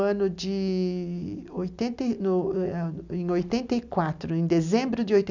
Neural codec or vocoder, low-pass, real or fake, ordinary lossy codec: none; 7.2 kHz; real; none